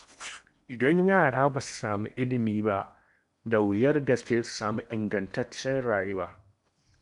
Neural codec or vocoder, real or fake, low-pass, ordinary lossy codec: codec, 16 kHz in and 24 kHz out, 0.8 kbps, FocalCodec, streaming, 65536 codes; fake; 10.8 kHz; none